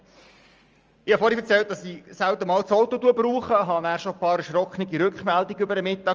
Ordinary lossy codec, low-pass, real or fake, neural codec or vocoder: Opus, 24 kbps; 7.2 kHz; real; none